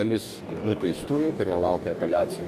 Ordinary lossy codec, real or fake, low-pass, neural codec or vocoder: MP3, 96 kbps; fake; 14.4 kHz; codec, 44.1 kHz, 2.6 kbps, DAC